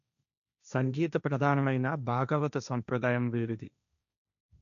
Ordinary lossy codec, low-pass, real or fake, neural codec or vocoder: none; 7.2 kHz; fake; codec, 16 kHz, 1.1 kbps, Voila-Tokenizer